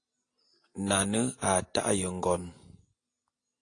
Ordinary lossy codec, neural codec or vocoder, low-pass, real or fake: AAC, 48 kbps; none; 9.9 kHz; real